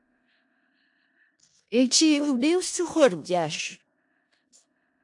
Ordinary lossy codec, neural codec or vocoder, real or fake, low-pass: AAC, 64 kbps; codec, 16 kHz in and 24 kHz out, 0.4 kbps, LongCat-Audio-Codec, four codebook decoder; fake; 10.8 kHz